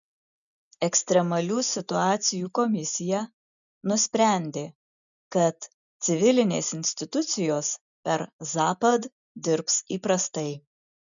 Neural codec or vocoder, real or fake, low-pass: none; real; 7.2 kHz